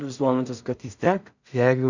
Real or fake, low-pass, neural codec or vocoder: fake; 7.2 kHz; codec, 16 kHz in and 24 kHz out, 0.4 kbps, LongCat-Audio-Codec, two codebook decoder